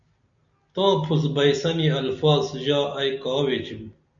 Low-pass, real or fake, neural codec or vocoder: 7.2 kHz; real; none